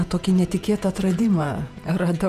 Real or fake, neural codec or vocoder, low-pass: fake; vocoder, 48 kHz, 128 mel bands, Vocos; 14.4 kHz